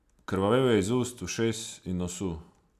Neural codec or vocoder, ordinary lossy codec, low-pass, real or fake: none; none; 14.4 kHz; real